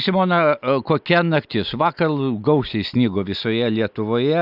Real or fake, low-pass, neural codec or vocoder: real; 5.4 kHz; none